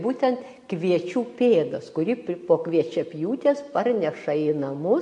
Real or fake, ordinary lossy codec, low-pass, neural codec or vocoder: real; MP3, 48 kbps; 10.8 kHz; none